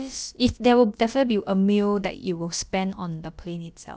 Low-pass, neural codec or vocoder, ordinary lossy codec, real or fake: none; codec, 16 kHz, about 1 kbps, DyCAST, with the encoder's durations; none; fake